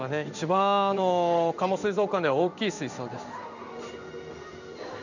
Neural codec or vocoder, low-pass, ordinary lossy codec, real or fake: codec, 16 kHz in and 24 kHz out, 1 kbps, XY-Tokenizer; 7.2 kHz; none; fake